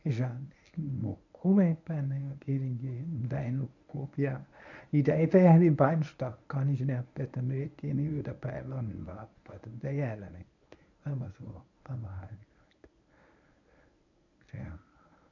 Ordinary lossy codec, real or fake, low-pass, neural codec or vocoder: none; fake; 7.2 kHz; codec, 24 kHz, 0.9 kbps, WavTokenizer, medium speech release version 1